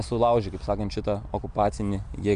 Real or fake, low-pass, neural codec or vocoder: real; 9.9 kHz; none